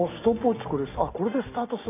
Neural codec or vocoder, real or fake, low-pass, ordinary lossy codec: none; real; 3.6 kHz; none